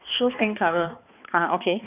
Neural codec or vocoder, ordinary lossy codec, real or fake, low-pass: codec, 16 kHz, 2 kbps, X-Codec, HuBERT features, trained on balanced general audio; none; fake; 3.6 kHz